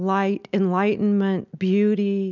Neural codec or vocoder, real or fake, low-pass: none; real; 7.2 kHz